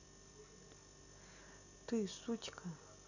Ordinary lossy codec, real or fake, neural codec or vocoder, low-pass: none; real; none; 7.2 kHz